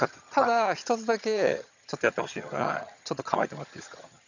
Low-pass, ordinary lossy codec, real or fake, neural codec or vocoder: 7.2 kHz; none; fake; vocoder, 22.05 kHz, 80 mel bands, HiFi-GAN